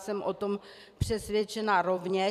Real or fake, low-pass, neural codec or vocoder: fake; 14.4 kHz; vocoder, 44.1 kHz, 128 mel bands every 256 samples, BigVGAN v2